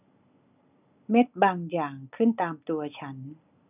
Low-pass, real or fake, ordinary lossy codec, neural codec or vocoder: 3.6 kHz; real; none; none